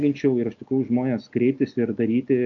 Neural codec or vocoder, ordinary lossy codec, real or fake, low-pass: none; AAC, 64 kbps; real; 7.2 kHz